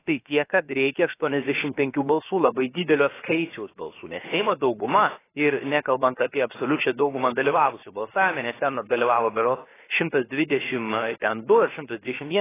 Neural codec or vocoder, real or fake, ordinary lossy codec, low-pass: codec, 16 kHz, about 1 kbps, DyCAST, with the encoder's durations; fake; AAC, 16 kbps; 3.6 kHz